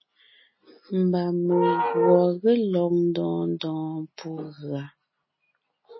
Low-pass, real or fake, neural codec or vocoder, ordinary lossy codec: 7.2 kHz; real; none; MP3, 24 kbps